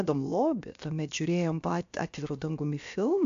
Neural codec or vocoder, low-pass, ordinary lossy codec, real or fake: codec, 16 kHz, about 1 kbps, DyCAST, with the encoder's durations; 7.2 kHz; AAC, 64 kbps; fake